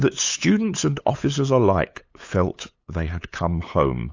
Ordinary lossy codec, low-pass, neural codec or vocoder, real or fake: AAC, 48 kbps; 7.2 kHz; codec, 16 kHz, 8 kbps, FunCodec, trained on LibriTTS, 25 frames a second; fake